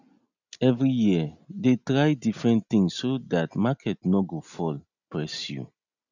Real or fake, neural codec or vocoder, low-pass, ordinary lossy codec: real; none; 7.2 kHz; none